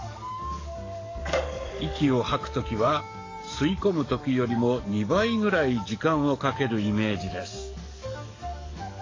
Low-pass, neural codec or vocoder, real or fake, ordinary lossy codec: 7.2 kHz; codec, 44.1 kHz, 7.8 kbps, DAC; fake; AAC, 32 kbps